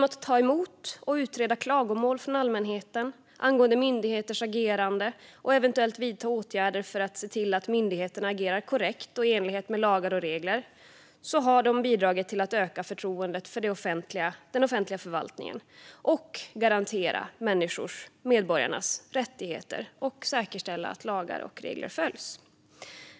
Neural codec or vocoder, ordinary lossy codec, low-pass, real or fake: none; none; none; real